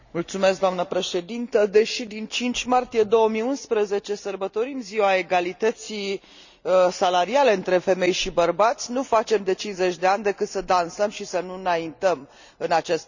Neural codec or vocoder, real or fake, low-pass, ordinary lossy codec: none; real; 7.2 kHz; none